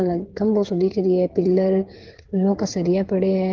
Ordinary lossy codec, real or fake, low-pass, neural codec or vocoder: Opus, 16 kbps; fake; 7.2 kHz; codec, 16 kHz, 8 kbps, FreqCodec, smaller model